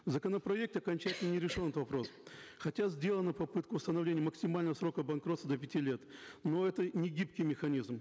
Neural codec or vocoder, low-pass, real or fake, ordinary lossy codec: none; none; real; none